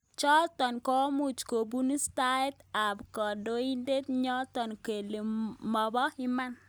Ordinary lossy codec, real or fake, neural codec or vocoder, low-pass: none; real; none; none